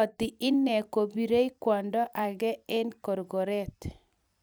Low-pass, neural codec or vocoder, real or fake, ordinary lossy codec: none; vocoder, 44.1 kHz, 128 mel bands every 256 samples, BigVGAN v2; fake; none